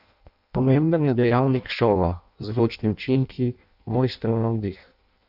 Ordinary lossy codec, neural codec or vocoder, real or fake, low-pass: none; codec, 16 kHz in and 24 kHz out, 0.6 kbps, FireRedTTS-2 codec; fake; 5.4 kHz